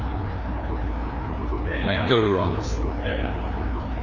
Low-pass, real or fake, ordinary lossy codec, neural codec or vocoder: 7.2 kHz; fake; none; codec, 16 kHz, 2 kbps, FreqCodec, larger model